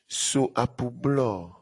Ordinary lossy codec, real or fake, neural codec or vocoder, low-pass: AAC, 48 kbps; real; none; 10.8 kHz